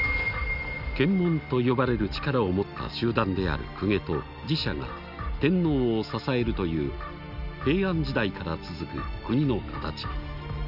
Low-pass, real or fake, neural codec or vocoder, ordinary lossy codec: 5.4 kHz; real; none; none